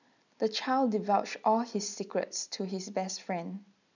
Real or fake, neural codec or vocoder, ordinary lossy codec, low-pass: real; none; none; 7.2 kHz